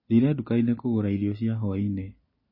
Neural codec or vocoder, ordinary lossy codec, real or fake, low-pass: none; MP3, 24 kbps; real; 5.4 kHz